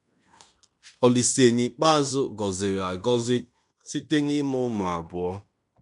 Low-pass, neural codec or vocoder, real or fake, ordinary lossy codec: 10.8 kHz; codec, 16 kHz in and 24 kHz out, 0.9 kbps, LongCat-Audio-Codec, fine tuned four codebook decoder; fake; none